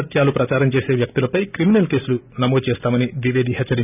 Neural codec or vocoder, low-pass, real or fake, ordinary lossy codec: none; 3.6 kHz; real; none